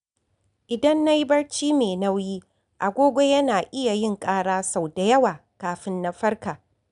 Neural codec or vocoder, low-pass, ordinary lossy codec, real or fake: none; 10.8 kHz; none; real